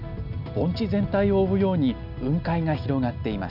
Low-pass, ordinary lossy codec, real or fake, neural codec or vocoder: 5.4 kHz; none; real; none